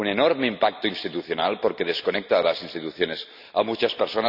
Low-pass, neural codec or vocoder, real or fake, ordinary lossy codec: 5.4 kHz; none; real; none